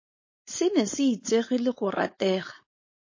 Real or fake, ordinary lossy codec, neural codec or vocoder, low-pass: fake; MP3, 32 kbps; codec, 16 kHz, 4.8 kbps, FACodec; 7.2 kHz